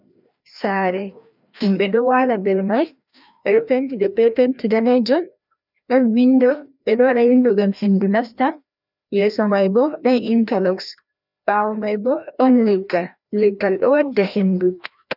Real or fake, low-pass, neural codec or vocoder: fake; 5.4 kHz; codec, 16 kHz, 1 kbps, FreqCodec, larger model